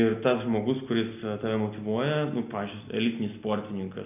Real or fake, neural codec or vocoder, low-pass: real; none; 3.6 kHz